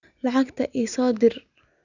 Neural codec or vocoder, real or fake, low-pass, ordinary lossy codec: none; real; 7.2 kHz; none